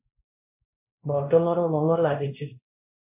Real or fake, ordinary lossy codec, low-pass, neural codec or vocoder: fake; MP3, 24 kbps; 3.6 kHz; codec, 16 kHz, 1 kbps, X-Codec, WavLM features, trained on Multilingual LibriSpeech